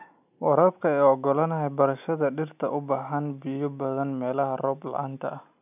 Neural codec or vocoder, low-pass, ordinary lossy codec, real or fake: none; 3.6 kHz; none; real